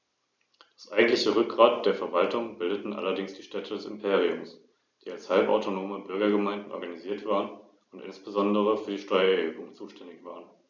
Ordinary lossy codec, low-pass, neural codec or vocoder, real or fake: none; 7.2 kHz; none; real